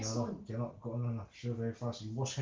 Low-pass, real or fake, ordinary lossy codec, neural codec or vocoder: 7.2 kHz; real; Opus, 16 kbps; none